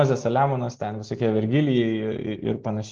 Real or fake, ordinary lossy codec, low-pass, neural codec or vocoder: real; Opus, 16 kbps; 7.2 kHz; none